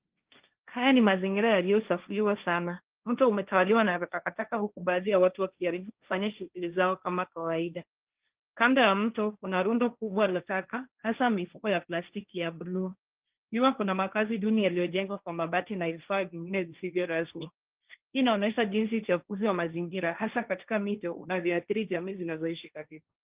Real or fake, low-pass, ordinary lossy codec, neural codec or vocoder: fake; 3.6 kHz; Opus, 32 kbps; codec, 16 kHz, 1.1 kbps, Voila-Tokenizer